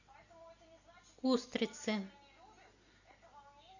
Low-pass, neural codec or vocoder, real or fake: 7.2 kHz; none; real